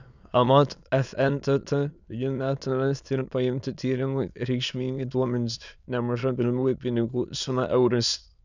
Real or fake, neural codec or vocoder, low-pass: fake; autoencoder, 22.05 kHz, a latent of 192 numbers a frame, VITS, trained on many speakers; 7.2 kHz